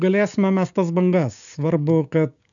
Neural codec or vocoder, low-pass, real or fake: codec, 16 kHz, 6 kbps, DAC; 7.2 kHz; fake